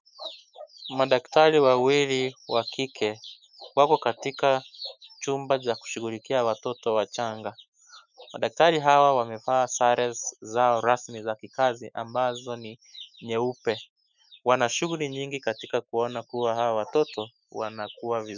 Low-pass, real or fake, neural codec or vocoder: 7.2 kHz; fake; autoencoder, 48 kHz, 128 numbers a frame, DAC-VAE, trained on Japanese speech